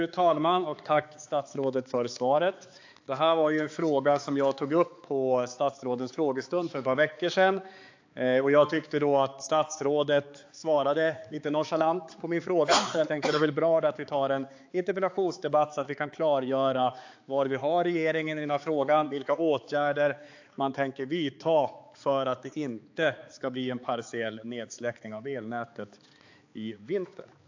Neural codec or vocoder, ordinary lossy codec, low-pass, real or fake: codec, 16 kHz, 4 kbps, X-Codec, HuBERT features, trained on balanced general audio; AAC, 48 kbps; 7.2 kHz; fake